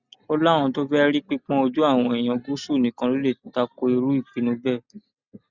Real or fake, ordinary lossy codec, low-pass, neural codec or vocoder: real; Opus, 64 kbps; 7.2 kHz; none